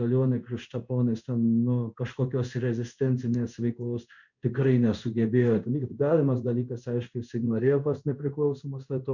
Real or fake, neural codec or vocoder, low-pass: fake; codec, 16 kHz in and 24 kHz out, 1 kbps, XY-Tokenizer; 7.2 kHz